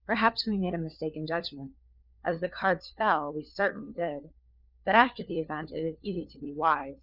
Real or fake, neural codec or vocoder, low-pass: fake; codec, 16 kHz, 4 kbps, FunCodec, trained on LibriTTS, 50 frames a second; 5.4 kHz